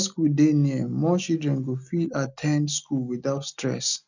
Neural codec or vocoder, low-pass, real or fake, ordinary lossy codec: none; 7.2 kHz; real; none